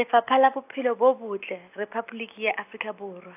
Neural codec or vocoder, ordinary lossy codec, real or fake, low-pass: none; AAC, 32 kbps; real; 3.6 kHz